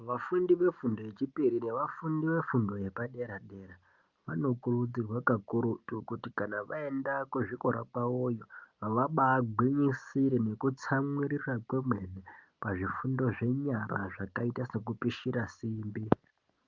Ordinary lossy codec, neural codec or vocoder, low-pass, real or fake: Opus, 24 kbps; none; 7.2 kHz; real